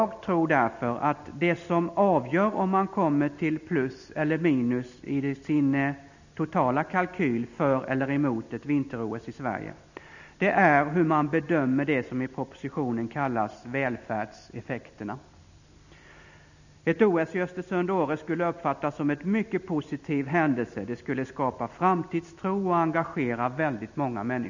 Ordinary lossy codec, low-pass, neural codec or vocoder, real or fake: none; 7.2 kHz; none; real